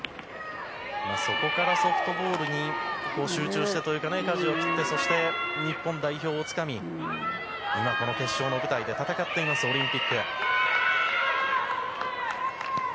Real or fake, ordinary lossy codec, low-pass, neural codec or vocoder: real; none; none; none